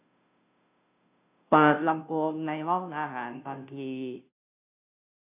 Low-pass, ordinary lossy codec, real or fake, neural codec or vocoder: 3.6 kHz; none; fake; codec, 16 kHz, 0.5 kbps, FunCodec, trained on Chinese and English, 25 frames a second